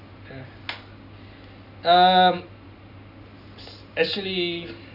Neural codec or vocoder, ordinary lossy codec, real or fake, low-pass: none; none; real; 5.4 kHz